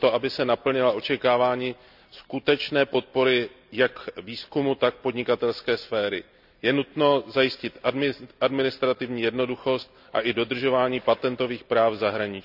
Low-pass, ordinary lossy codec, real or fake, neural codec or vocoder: 5.4 kHz; none; real; none